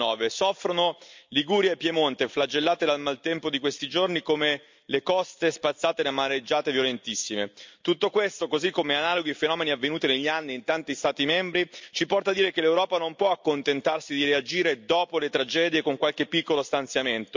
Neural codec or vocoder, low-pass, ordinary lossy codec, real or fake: none; 7.2 kHz; MP3, 64 kbps; real